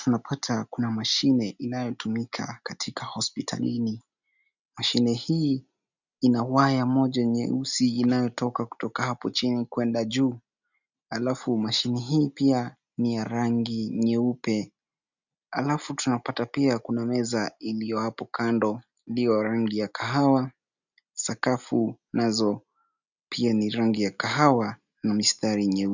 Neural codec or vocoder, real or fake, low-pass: none; real; 7.2 kHz